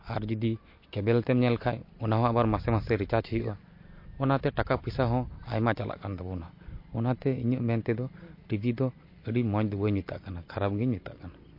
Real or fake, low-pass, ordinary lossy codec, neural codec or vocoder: real; 5.4 kHz; MP3, 32 kbps; none